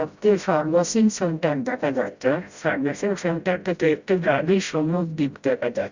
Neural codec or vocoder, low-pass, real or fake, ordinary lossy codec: codec, 16 kHz, 0.5 kbps, FreqCodec, smaller model; 7.2 kHz; fake; Opus, 64 kbps